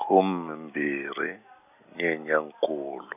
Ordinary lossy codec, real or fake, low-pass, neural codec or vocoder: none; real; 3.6 kHz; none